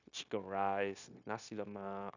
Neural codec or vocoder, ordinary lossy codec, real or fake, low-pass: codec, 16 kHz, 0.9 kbps, LongCat-Audio-Codec; none; fake; 7.2 kHz